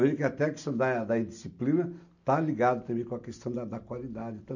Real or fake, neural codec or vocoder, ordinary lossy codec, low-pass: real; none; none; 7.2 kHz